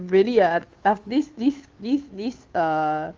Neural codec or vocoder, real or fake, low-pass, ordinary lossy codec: codec, 16 kHz, 2 kbps, FunCodec, trained on Chinese and English, 25 frames a second; fake; 7.2 kHz; Opus, 32 kbps